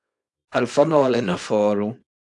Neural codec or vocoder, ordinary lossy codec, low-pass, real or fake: codec, 24 kHz, 0.9 kbps, WavTokenizer, small release; AAC, 64 kbps; 10.8 kHz; fake